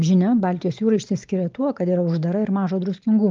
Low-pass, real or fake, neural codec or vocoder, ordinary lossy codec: 7.2 kHz; real; none; Opus, 32 kbps